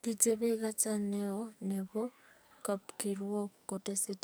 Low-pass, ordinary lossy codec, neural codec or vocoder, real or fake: none; none; codec, 44.1 kHz, 2.6 kbps, SNAC; fake